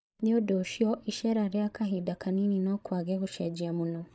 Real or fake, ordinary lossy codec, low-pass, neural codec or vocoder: fake; none; none; codec, 16 kHz, 16 kbps, FreqCodec, larger model